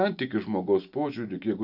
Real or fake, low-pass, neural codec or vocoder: real; 5.4 kHz; none